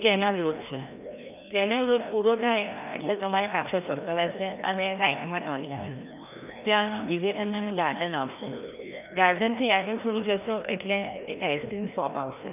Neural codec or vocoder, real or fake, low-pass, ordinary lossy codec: codec, 16 kHz, 1 kbps, FreqCodec, larger model; fake; 3.6 kHz; none